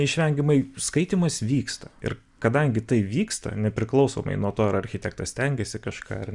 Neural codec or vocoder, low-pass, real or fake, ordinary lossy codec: none; 10.8 kHz; real; Opus, 64 kbps